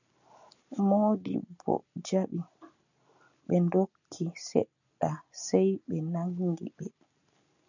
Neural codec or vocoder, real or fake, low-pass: none; real; 7.2 kHz